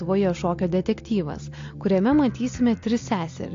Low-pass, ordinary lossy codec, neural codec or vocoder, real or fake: 7.2 kHz; AAC, 48 kbps; none; real